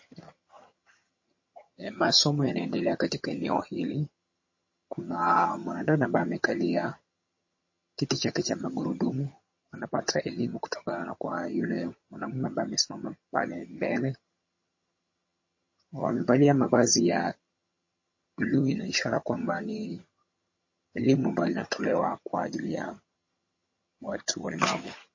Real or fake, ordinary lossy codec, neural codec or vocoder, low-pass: fake; MP3, 32 kbps; vocoder, 22.05 kHz, 80 mel bands, HiFi-GAN; 7.2 kHz